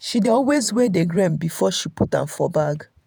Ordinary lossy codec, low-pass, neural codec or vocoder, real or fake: none; none; vocoder, 48 kHz, 128 mel bands, Vocos; fake